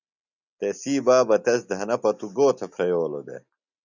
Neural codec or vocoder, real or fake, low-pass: none; real; 7.2 kHz